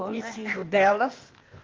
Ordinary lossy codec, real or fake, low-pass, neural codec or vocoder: Opus, 32 kbps; fake; 7.2 kHz; codec, 16 kHz, 0.8 kbps, ZipCodec